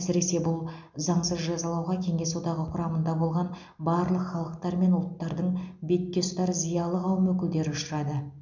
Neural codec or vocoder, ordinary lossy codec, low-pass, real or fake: none; none; 7.2 kHz; real